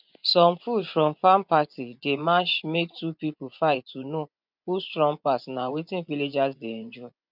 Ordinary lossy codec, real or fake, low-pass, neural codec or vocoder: none; fake; 5.4 kHz; vocoder, 24 kHz, 100 mel bands, Vocos